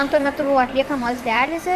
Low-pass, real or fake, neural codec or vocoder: 14.4 kHz; fake; vocoder, 44.1 kHz, 128 mel bands, Pupu-Vocoder